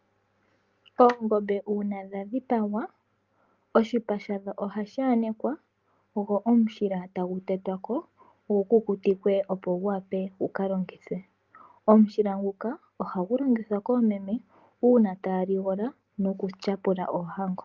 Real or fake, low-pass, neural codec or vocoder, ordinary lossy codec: real; 7.2 kHz; none; Opus, 32 kbps